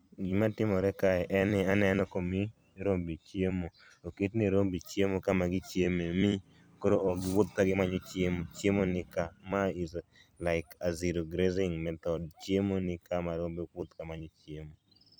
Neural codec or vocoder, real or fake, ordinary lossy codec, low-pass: vocoder, 44.1 kHz, 128 mel bands every 256 samples, BigVGAN v2; fake; none; none